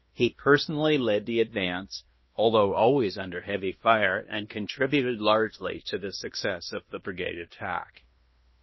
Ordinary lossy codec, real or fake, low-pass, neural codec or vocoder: MP3, 24 kbps; fake; 7.2 kHz; codec, 16 kHz in and 24 kHz out, 0.9 kbps, LongCat-Audio-Codec, fine tuned four codebook decoder